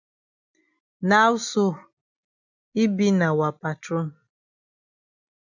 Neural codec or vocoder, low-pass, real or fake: none; 7.2 kHz; real